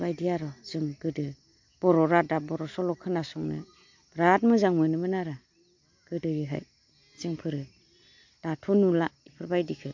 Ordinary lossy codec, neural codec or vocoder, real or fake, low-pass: MP3, 48 kbps; none; real; 7.2 kHz